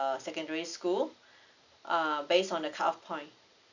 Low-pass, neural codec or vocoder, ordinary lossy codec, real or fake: 7.2 kHz; none; none; real